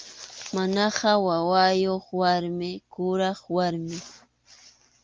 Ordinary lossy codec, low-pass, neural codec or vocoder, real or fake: Opus, 24 kbps; 7.2 kHz; none; real